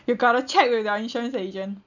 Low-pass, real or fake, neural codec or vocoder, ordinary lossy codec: 7.2 kHz; real; none; none